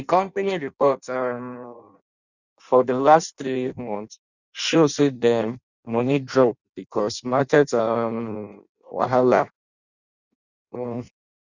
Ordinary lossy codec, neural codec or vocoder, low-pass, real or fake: none; codec, 16 kHz in and 24 kHz out, 0.6 kbps, FireRedTTS-2 codec; 7.2 kHz; fake